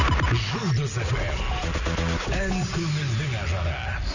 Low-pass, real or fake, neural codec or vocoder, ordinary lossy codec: 7.2 kHz; real; none; none